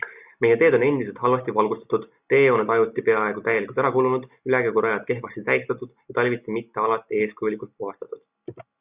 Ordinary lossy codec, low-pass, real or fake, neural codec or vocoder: Opus, 64 kbps; 3.6 kHz; real; none